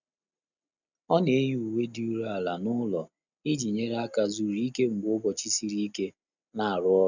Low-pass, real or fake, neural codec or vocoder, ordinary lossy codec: 7.2 kHz; real; none; none